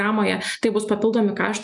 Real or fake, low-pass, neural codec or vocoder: real; 10.8 kHz; none